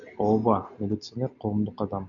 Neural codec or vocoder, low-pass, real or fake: none; 7.2 kHz; real